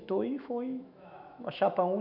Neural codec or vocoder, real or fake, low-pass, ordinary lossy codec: autoencoder, 48 kHz, 128 numbers a frame, DAC-VAE, trained on Japanese speech; fake; 5.4 kHz; none